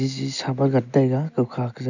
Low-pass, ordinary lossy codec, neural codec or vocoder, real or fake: 7.2 kHz; none; none; real